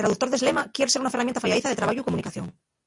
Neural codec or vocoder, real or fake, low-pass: none; real; 10.8 kHz